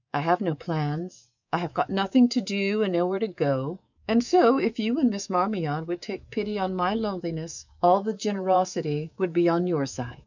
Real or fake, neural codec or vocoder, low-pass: fake; codec, 24 kHz, 3.1 kbps, DualCodec; 7.2 kHz